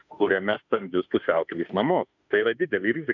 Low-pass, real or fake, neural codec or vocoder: 7.2 kHz; fake; autoencoder, 48 kHz, 32 numbers a frame, DAC-VAE, trained on Japanese speech